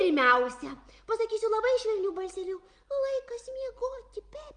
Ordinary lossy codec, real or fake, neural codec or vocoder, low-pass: Opus, 32 kbps; real; none; 9.9 kHz